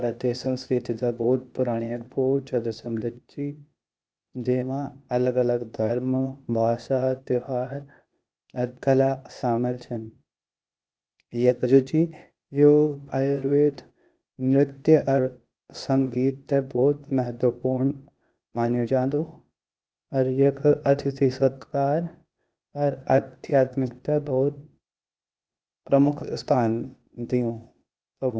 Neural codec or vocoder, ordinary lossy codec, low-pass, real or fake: codec, 16 kHz, 0.8 kbps, ZipCodec; none; none; fake